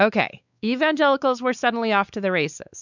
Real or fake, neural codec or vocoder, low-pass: fake; codec, 16 kHz, 4 kbps, X-Codec, WavLM features, trained on Multilingual LibriSpeech; 7.2 kHz